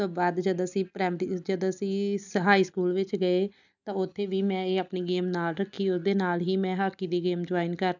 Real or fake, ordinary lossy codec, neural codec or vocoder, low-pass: real; none; none; 7.2 kHz